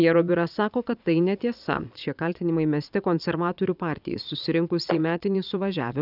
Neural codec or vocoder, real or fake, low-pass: vocoder, 22.05 kHz, 80 mel bands, Vocos; fake; 5.4 kHz